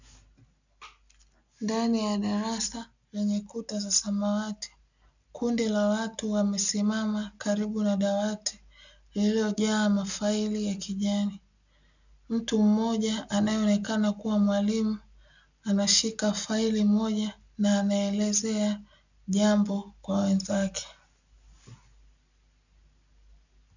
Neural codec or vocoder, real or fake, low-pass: none; real; 7.2 kHz